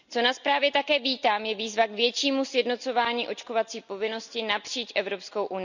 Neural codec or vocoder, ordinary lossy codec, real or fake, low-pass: none; none; real; 7.2 kHz